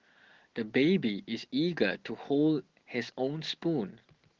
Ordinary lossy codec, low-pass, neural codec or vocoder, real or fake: Opus, 16 kbps; 7.2 kHz; none; real